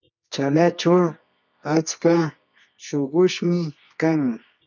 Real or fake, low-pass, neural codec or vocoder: fake; 7.2 kHz; codec, 24 kHz, 0.9 kbps, WavTokenizer, medium music audio release